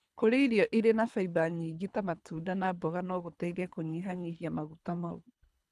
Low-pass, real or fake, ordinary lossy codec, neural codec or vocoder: none; fake; none; codec, 24 kHz, 3 kbps, HILCodec